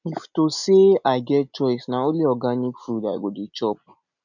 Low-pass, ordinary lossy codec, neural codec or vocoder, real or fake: 7.2 kHz; none; none; real